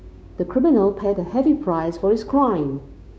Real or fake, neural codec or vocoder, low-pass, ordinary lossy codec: fake; codec, 16 kHz, 6 kbps, DAC; none; none